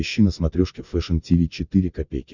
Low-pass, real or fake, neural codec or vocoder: 7.2 kHz; real; none